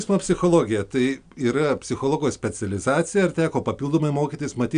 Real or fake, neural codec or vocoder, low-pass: real; none; 9.9 kHz